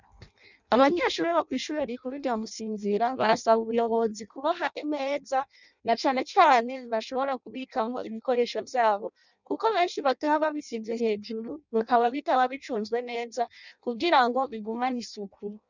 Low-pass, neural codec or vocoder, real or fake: 7.2 kHz; codec, 16 kHz in and 24 kHz out, 0.6 kbps, FireRedTTS-2 codec; fake